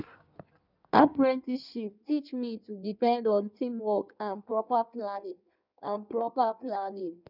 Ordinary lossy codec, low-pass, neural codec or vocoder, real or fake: none; 5.4 kHz; codec, 16 kHz in and 24 kHz out, 1.1 kbps, FireRedTTS-2 codec; fake